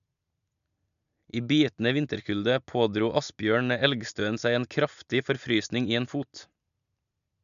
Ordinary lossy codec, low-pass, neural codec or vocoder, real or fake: none; 7.2 kHz; none; real